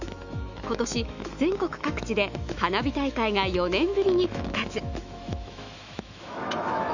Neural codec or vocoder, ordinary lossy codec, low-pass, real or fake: autoencoder, 48 kHz, 128 numbers a frame, DAC-VAE, trained on Japanese speech; none; 7.2 kHz; fake